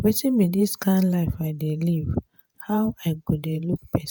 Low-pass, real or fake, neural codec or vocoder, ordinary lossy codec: none; fake; vocoder, 48 kHz, 128 mel bands, Vocos; none